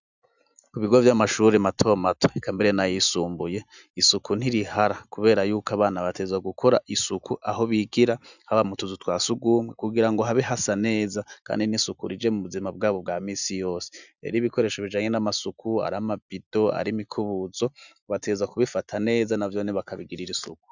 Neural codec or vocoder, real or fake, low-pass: none; real; 7.2 kHz